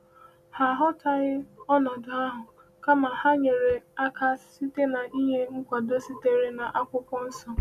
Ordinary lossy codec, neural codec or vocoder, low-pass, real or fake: none; none; 14.4 kHz; real